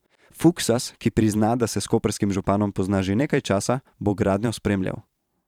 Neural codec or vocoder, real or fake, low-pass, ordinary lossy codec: vocoder, 44.1 kHz, 128 mel bands every 512 samples, BigVGAN v2; fake; 19.8 kHz; none